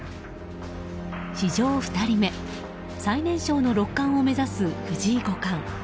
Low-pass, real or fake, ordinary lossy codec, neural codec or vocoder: none; real; none; none